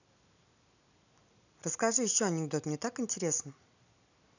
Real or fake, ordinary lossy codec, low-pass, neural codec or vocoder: real; none; 7.2 kHz; none